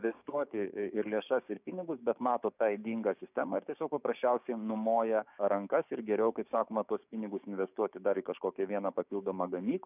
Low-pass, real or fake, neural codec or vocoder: 3.6 kHz; fake; codec, 16 kHz, 6 kbps, DAC